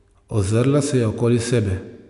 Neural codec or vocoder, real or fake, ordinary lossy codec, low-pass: none; real; none; 10.8 kHz